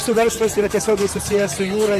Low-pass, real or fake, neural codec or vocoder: 14.4 kHz; fake; vocoder, 44.1 kHz, 128 mel bands, Pupu-Vocoder